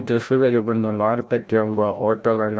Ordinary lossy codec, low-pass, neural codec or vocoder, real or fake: none; none; codec, 16 kHz, 0.5 kbps, FreqCodec, larger model; fake